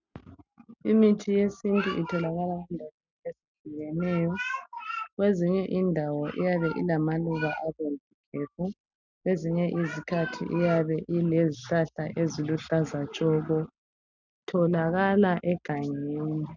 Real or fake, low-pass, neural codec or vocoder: real; 7.2 kHz; none